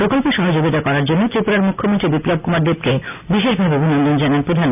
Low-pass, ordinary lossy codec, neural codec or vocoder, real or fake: 3.6 kHz; none; none; real